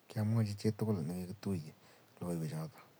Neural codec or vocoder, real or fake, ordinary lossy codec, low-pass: none; real; none; none